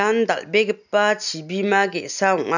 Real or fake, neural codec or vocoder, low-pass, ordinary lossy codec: real; none; 7.2 kHz; none